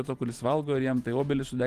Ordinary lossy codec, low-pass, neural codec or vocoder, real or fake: Opus, 24 kbps; 14.4 kHz; vocoder, 44.1 kHz, 128 mel bands every 512 samples, BigVGAN v2; fake